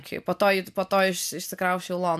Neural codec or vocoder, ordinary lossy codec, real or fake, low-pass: none; MP3, 96 kbps; real; 14.4 kHz